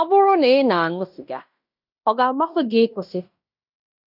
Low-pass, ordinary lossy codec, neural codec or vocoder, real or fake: 5.4 kHz; none; codec, 16 kHz in and 24 kHz out, 0.9 kbps, LongCat-Audio-Codec, fine tuned four codebook decoder; fake